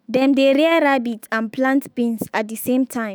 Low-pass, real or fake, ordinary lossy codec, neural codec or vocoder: none; fake; none; autoencoder, 48 kHz, 128 numbers a frame, DAC-VAE, trained on Japanese speech